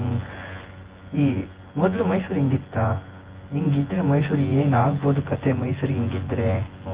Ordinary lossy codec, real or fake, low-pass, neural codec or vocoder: Opus, 16 kbps; fake; 3.6 kHz; vocoder, 24 kHz, 100 mel bands, Vocos